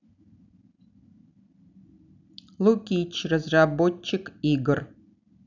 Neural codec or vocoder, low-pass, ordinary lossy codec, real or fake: none; 7.2 kHz; none; real